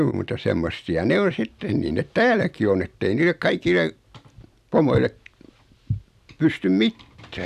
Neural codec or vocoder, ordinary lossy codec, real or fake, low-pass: none; none; real; 14.4 kHz